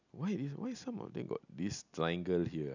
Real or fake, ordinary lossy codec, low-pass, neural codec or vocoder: real; none; 7.2 kHz; none